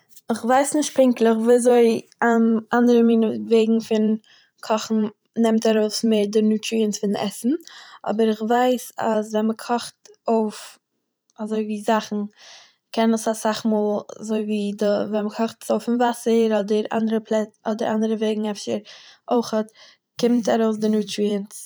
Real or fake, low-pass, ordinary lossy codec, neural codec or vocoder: fake; none; none; vocoder, 44.1 kHz, 128 mel bands every 512 samples, BigVGAN v2